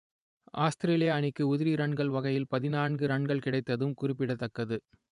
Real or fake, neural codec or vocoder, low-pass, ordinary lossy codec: fake; vocoder, 24 kHz, 100 mel bands, Vocos; 10.8 kHz; none